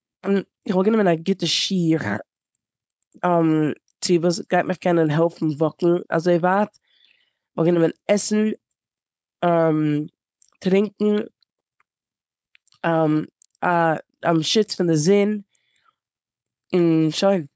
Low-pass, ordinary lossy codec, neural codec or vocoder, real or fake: none; none; codec, 16 kHz, 4.8 kbps, FACodec; fake